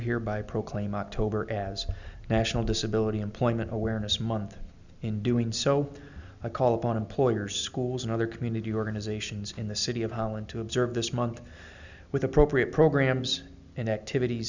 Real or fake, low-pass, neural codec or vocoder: real; 7.2 kHz; none